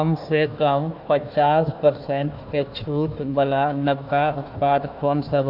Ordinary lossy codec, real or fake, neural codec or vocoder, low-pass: none; fake; codec, 16 kHz, 1 kbps, FunCodec, trained on Chinese and English, 50 frames a second; 5.4 kHz